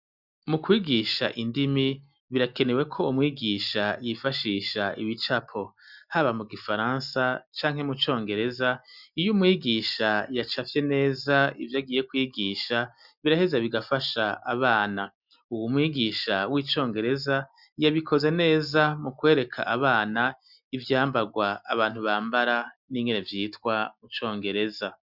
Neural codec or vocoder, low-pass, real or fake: none; 5.4 kHz; real